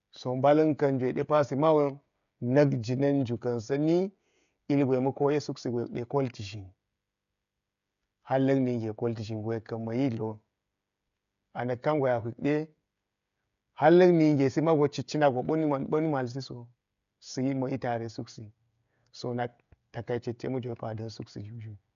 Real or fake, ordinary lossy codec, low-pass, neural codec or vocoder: fake; none; 7.2 kHz; codec, 16 kHz, 16 kbps, FreqCodec, smaller model